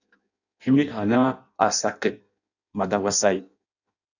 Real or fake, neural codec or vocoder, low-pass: fake; codec, 16 kHz in and 24 kHz out, 0.6 kbps, FireRedTTS-2 codec; 7.2 kHz